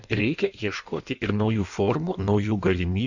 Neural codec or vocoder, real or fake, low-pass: codec, 16 kHz in and 24 kHz out, 1.1 kbps, FireRedTTS-2 codec; fake; 7.2 kHz